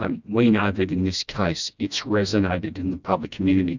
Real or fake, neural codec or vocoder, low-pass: fake; codec, 16 kHz, 1 kbps, FreqCodec, smaller model; 7.2 kHz